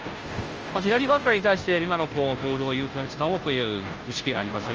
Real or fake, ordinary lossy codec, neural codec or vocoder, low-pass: fake; Opus, 24 kbps; codec, 16 kHz, 0.5 kbps, FunCodec, trained on Chinese and English, 25 frames a second; 7.2 kHz